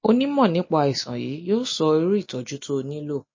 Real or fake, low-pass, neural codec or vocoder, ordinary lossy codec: real; 7.2 kHz; none; MP3, 32 kbps